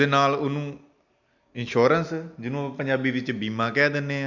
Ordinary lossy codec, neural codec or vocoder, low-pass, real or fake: none; none; 7.2 kHz; real